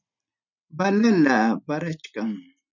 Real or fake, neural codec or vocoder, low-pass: real; none; 7.2 kHz